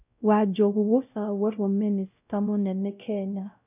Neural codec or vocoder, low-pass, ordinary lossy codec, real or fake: codec, 16 kHz, 0.5 kbps, X-Codec, WavLM features, trained on Multilingual LibriSpeech; 3.6 kHz; none; fake